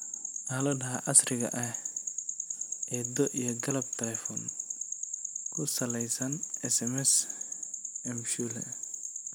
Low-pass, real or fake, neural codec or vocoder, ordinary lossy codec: none; real; none; none